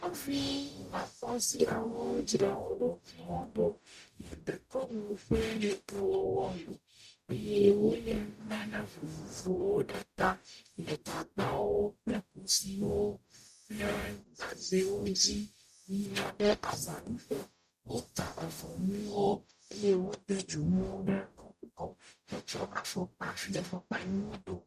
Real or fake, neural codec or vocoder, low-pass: fake; codec, 44.1 kHz, 0.9 kbps, DAC; 14.4 kHz